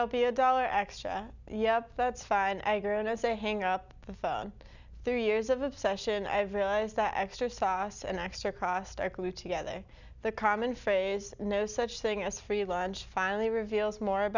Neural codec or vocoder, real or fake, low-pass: none; real; 7.2 kHz